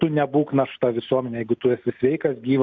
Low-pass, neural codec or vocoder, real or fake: 7.2 kHz; none; real